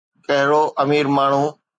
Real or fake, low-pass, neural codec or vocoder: real; 9.9 kHz; none